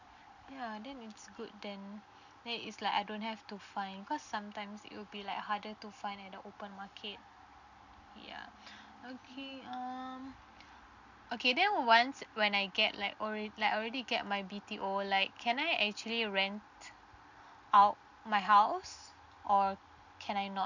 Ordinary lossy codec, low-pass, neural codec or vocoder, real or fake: none; 7.2 kHz; none; real